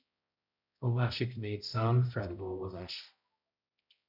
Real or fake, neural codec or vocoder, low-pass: fake; codec, 16 kHz, 0.5 kbps, X-Codec, HuBERT features, trained on balanced general audio; 5.4 kHz